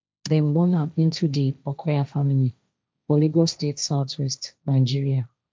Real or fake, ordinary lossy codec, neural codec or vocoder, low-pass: fake; none; codec, 16 kHz, 1.1 kbps, Voila-Tokenizer; none